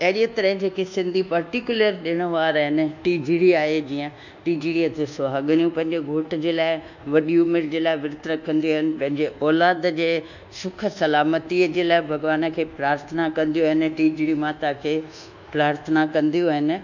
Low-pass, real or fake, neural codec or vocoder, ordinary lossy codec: 7.2 kHz; fake; codec, 24 kHz, 1.2 kbps, DualCodec; none